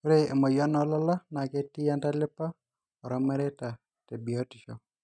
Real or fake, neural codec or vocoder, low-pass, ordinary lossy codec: real; none; 9.9 kHz; none